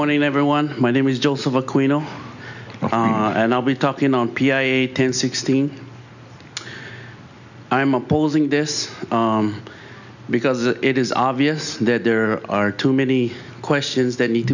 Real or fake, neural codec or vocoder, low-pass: real; none; 7.2 kHz